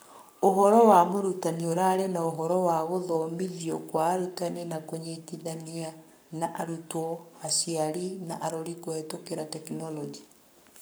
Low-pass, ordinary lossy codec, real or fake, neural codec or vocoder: none; none; fake; codec, 44.1 kHz, 7.8 kbps, Pupu-Codec